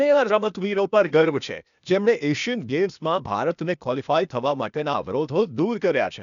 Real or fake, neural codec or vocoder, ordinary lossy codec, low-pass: fake; codec, 16 kHz, 0.8 kbps, ZipCodec; none; 7.2 kHz